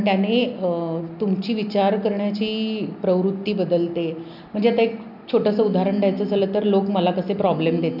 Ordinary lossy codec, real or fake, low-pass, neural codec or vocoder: none; real; 5.4 kHz; none